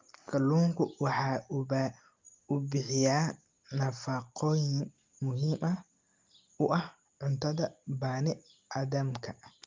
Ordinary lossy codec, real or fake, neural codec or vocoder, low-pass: Opus, 32 kbps; real; none; 7.2 kHz